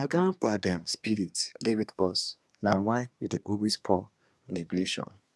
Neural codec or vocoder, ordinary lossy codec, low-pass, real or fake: codec, 24 kHz, 1 kbps, SNAC; none; none; fake